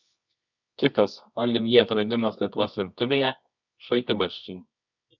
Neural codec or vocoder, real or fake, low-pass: codec, 24 kHz, 0.9 kbps, WavTokenizer, medium music audio release; fake; 7.2 kHz